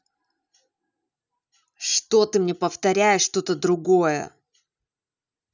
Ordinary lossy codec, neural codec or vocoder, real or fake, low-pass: none; none; real; 7.2 kHz